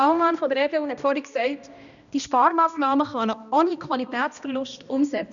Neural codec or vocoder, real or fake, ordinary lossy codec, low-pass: codec, 16 kHz, 1 kbps, X-Codec, HuBERT features, trained on balanced general audio; fake; none; 7.2 kHz